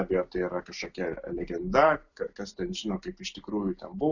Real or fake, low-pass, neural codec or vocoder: real; 7.2 kHz; none